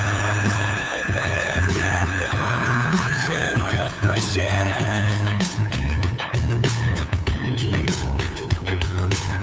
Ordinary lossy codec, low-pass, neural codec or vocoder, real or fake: none; none; codec, 16 kHz, 2 kbps, FunCodec, trained on LibriTTS, 25 frames a second; fake